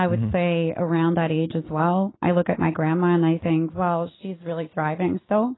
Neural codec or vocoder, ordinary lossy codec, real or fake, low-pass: none; AAC, 16 kbps; real; 7.2 kHz